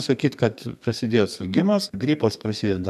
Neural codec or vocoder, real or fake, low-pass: codec, 32 kHz, 1.9 kbps, SNAC; fake; 14.4 kHz